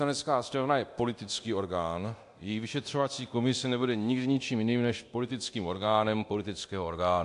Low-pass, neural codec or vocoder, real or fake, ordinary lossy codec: 10.8 kHz; codec, 24 kHz, 0.9 kbps, DualCodec; fake; AAC, 64 kbps